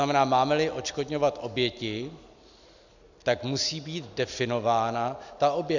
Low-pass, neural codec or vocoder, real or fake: 7.2 kHz; none; real